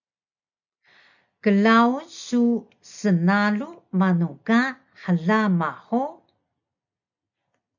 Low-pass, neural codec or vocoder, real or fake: 7.2 kHz; none; real